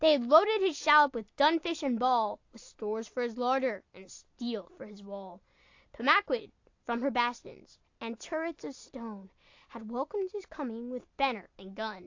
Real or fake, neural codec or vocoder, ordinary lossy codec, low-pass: real; none; AAC, 48 kbps; 7.2 kHz